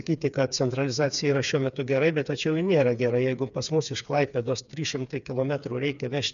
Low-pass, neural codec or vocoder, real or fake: 7.2 kHz; codec, 16 kHz, 4 kbps, FreqCodec, smaller model; fake